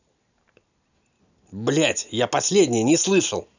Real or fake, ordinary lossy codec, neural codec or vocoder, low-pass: real; none; none; 7.2 kHz